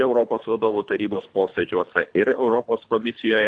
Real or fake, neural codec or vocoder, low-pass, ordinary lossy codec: fake; codec, 24 kHz, 3 kbps, HILCodec; 9.9 kHz; AAC, 64 kbps